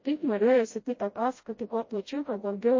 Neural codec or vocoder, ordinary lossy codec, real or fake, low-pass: codec, 16 kHz, 0.5 kbps, FreqCodec, smaller model; MP3, 32 kbps; fake; 7.2 kHz